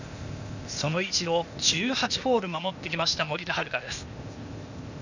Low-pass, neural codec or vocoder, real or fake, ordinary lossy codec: 7.2 kHz; codec, 16 kHz, 0.8 kbps, ZipCodec; fake; none